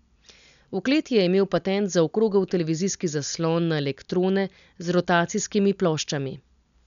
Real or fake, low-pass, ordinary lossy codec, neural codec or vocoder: real; 7.2 kHz; none; none